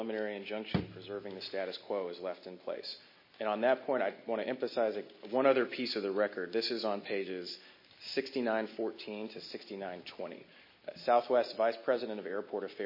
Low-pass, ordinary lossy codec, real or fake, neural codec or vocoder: 5.4 kHz; MP3, 24 kbps; real; none